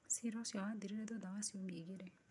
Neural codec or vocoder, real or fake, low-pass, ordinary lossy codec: vocoder, 48 kHz, 128 mel bands, Vocos; fake; 10.8 kHz; none